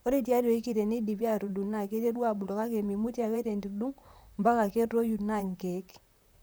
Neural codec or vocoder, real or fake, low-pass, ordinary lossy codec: vocoder, 44.1 kHz, 128 mel bands, Pupu-Vocoder; fake; none; none